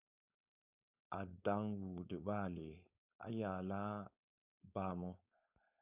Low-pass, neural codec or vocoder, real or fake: 3.6 kHz; codec, 16 kHz, 4.8 kbps, FACodec; fake